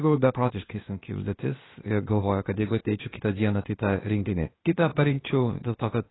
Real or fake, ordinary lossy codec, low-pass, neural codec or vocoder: fake; AAC, 16 kbps; 7.2 kHz; codec, 16 kHz, 0.8 kbps, ZipCodec